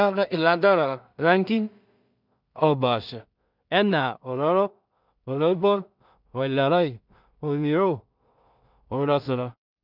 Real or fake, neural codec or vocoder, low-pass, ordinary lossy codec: fake; codec, 16 kHz in and 24 kHz out, 0.4 kbps, LongCat-Audio-Codec, two codebook decoder; 5.4 kHz; none